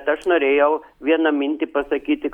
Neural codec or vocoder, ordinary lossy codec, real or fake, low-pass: none; Opus, 64 kbps; real; 19.8 kHz